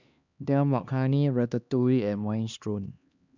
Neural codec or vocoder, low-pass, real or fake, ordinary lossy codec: codec, 16 kHz, 2 kbps, X-Codec, HuBERT features, trained on LibriSpeech; 7.2 kHz; fake; none